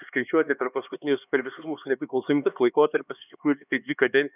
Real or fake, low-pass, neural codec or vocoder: fake; 3.6 kHz; codec, 16 kHz, 2 kbps, X-Codec, HuBERT features, trained on LibriSpeech